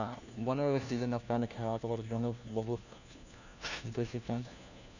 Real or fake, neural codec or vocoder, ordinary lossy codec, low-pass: fake; codec, 16 kHz, 1 kbps, FunCodec, trained on LibriTTS, 50 frames a second; none; 7.2 kHz